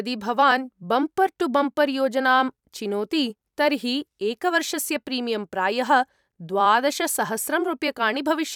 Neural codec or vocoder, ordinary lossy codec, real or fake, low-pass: vocoder, 44.1 kHz, 128 mel bands every 256 samples, BigVGAN v2; none; fake; 19.8 kHz